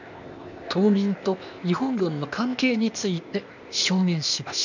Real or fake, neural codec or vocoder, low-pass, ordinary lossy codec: fake; codec, 16 kHz, 0.8 kbps, ZipCodec; 7.2 kHz; none